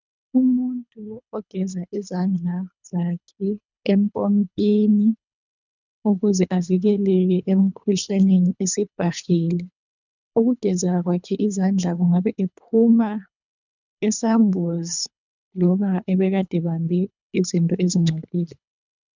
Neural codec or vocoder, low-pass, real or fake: codec, 24 kHz, 3 kbps, HILCodec; 7.2 kHz; fake